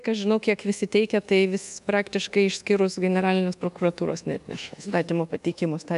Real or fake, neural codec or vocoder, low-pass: fake; codec, 24 kHz, 1.2 kbps, DualCodec; 10.8 kHz